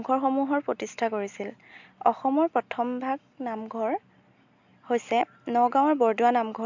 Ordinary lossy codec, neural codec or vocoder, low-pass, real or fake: MP3, 64 kbps; none; 7.2 kHz; real